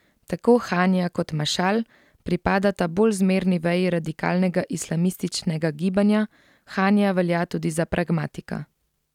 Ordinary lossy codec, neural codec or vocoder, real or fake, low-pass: none; none; real; 19.8 kHz